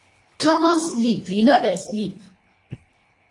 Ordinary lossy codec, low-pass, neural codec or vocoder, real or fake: AAC, 32 kbps; 10.8 kHz; codec, 24 kHz, 1.5 kbps, HILCodec; fake